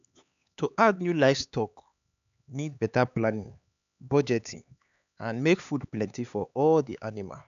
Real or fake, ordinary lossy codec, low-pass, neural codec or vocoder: fake; none; 7.2 kHz; codec, 16 kHz, 4 kbps, X-Codec, HuBERT features, trained on LibriSpeech